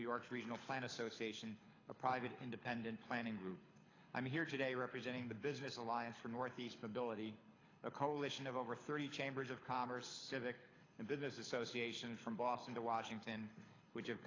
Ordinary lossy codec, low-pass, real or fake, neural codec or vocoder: AAC, 32 kbps; 7.2 kHz; fake; codec, 24 kHz, 6 kbps, HILCodec